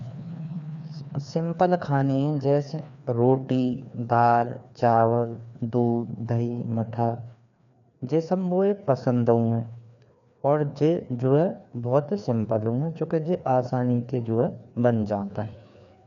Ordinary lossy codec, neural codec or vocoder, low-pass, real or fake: none; codec, 16 kHz, 2 kbps, FreqCodec, larger model; 7.2 kHz; fake